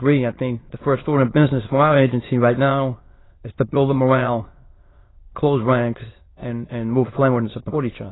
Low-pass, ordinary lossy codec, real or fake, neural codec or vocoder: 7.2 kHz; AAC, 16 kbps; fake; autoencoder, 22.05 kHz, a latent of 192 numbers a frame, VITS, trained on many speakers